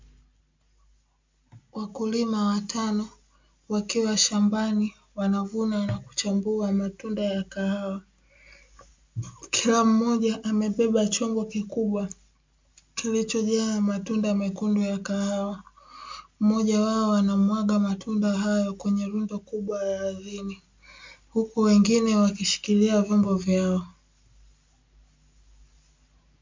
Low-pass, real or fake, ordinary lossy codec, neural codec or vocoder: 7.2 kHz; real; MP3, 64 kbps; none